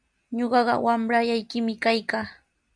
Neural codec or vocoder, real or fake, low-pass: none; real; 9.9 kHz